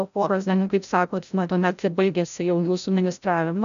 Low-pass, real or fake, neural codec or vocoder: 7.2 kHz; fake; codec, 16 kHz, 0.5 kbps, FreqCodec, larger model